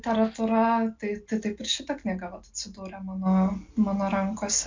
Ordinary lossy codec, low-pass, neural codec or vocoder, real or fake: MP3, 64 kbps; 7.2 kHz; none; real